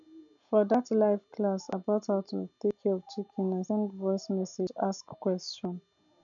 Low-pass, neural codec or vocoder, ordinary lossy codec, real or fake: 7.2 kHz; none; none; real